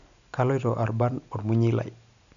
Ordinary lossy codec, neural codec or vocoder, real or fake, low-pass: none; none; real; 7.2 kHz